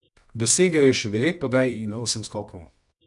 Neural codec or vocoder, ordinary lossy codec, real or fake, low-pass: codec, 24 kHz, 0.9 kbps, WavTokenizer, medium music audio release; none; fake; 10.8 kHz